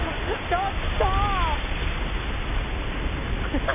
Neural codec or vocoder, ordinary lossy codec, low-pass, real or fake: none; none; 3.6 kHz; real